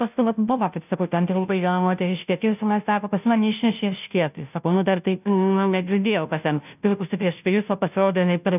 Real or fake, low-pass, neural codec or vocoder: fake; 3.6 kHz; codec, 16 kHz, 0.5 kbps, FunCodec, trained on Chinese and English, 25 frames a second